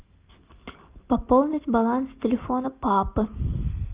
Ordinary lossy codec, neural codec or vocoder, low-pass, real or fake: Opus, 24 kbps; none; 3.6 kHz; real